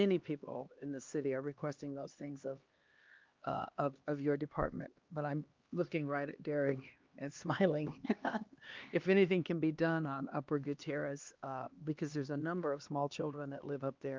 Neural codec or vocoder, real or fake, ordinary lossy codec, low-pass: codec, 16 kHz, 2 kbps, X-Codec, HuBERT features, trained on LibriSpeech; fake; Opus, 24 kbps; 7.2 kHz